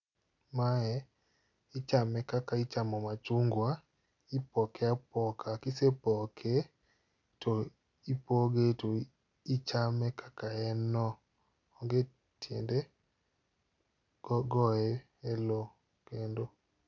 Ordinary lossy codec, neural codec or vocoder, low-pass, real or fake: none; none; 7.2 kHz; real